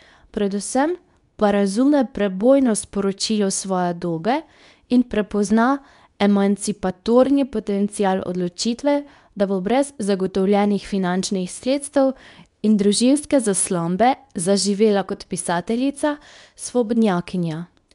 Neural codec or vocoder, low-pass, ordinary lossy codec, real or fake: codec, 24 kHz, 0.9 kbps, WavTokenizer, medium speech release version 2; 10.8 kHz; none; fake